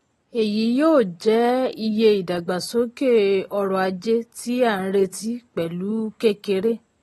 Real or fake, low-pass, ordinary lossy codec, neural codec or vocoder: real; 19.8 kHz; AAC, 32 kbps; none